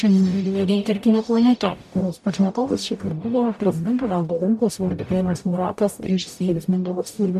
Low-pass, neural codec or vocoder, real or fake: 14.4 kHz; codec, 44.1 kHz, 0.9 kbps, DAC; fake